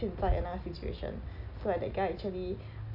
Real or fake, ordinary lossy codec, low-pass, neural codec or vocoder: real; none; 5.4 kHz; none